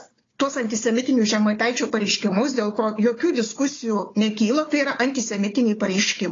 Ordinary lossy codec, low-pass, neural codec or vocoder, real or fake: AAC, 32 kbps; 7.2 kHz; codec, 16 kHz, 4 kbps, FunCodec, trained on Chinese and English, 50 frames a second; fake